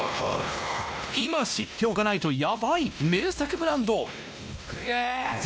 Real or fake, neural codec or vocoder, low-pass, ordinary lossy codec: fake; codec, 16 kHz, 1 kbps, X-Codec, WavLM features, trained on Multilingual LibriSpeech; none; none